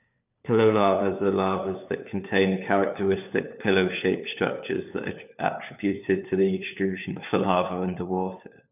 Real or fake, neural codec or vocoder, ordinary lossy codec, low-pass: fake; codec, 16 kHz, 4 kbps, FunCodec, trained on LibriTTS, 50 frames a second; none; 3.6 kHz